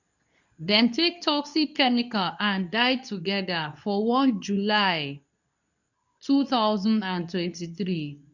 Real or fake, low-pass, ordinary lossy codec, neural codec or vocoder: fake; 7.2 kHz; none; codec, 24 kHz, 0.9 kbps, WavTokenizer, medium speech release version 2